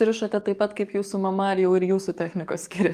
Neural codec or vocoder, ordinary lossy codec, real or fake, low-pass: codec, 44.1 kHz, 7.8 kbps, DAC; Opus, 32 kbps; fake; 14.4 kHz